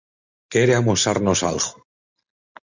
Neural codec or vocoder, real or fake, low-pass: none; real; 7.2 kHz